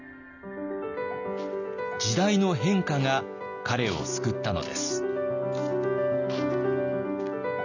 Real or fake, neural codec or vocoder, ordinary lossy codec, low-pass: real; none; none; 7.2 kHz